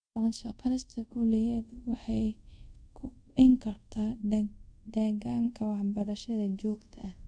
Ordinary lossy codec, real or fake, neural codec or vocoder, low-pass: none; fake; codec, 24 kHz, 0.5 kbps, DualCodec; 9.9 kHz